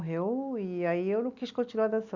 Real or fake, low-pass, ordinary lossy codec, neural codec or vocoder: real; 7.2 kHz; Opus, 64 kbps; none